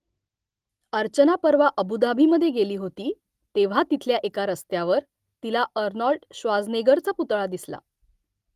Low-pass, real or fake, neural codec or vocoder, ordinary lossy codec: 14.4 kHz; real; none; Opus, 24 kbps